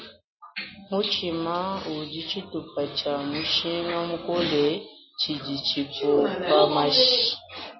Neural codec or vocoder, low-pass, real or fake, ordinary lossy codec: none; 7.2 kHz; real; MP3, 24 kbps